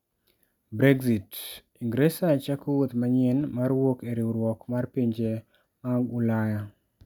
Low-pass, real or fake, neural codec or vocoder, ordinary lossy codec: 19.8 kHz; real; none; none